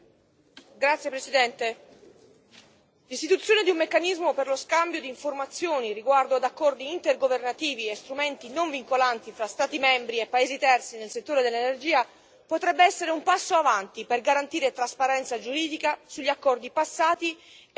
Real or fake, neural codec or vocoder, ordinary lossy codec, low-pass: real; none; none; none